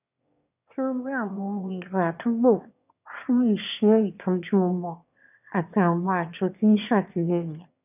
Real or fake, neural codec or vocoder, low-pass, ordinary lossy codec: fake; autoencoder, 22.05 kHz, a latent of 192 numbers a frame, VITS, trained on one speaker; 3.6 kHz; none